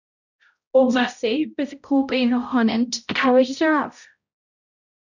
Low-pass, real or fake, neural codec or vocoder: 7.2 kHz; fake; codec, 16 kHz, 0.5 kbps, X-Codec, HuBERT features, trained on balanced general audio